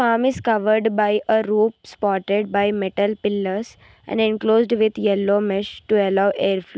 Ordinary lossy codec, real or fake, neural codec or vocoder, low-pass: none; real; none; none